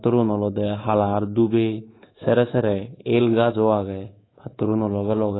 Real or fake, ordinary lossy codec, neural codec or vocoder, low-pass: fake; AAC, 16 kbps; codec, 24 kHz, 3.1 kbps, DualCodec; 7.2 kHz